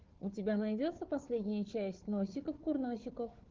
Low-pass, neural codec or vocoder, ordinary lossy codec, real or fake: 7.2 kHz; codec, 16 kHz, 4 kbps, FunCodec, trained on Chinese and English, 50 frames a second; Opus, 16 kbps; fake